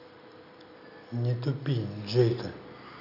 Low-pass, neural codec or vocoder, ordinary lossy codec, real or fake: 5.4 kHz; none; none; real